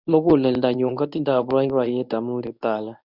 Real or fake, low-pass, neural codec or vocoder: fake; 5.4 kHz; codec, 24 kHz, 0.9 kbps, WavTokenizer, medium speech release version 1